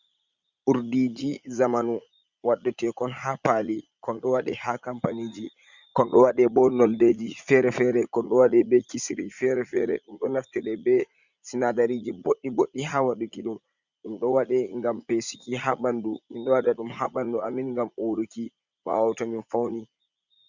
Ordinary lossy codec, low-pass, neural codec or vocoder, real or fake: Opus, 64 kbps; 7.2 kHz; vocoder, 44.1 kHz, 80 mel bands, Vocos; fake